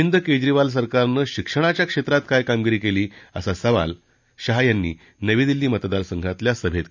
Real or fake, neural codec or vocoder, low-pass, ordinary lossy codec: real; none; 7.2 kHz; none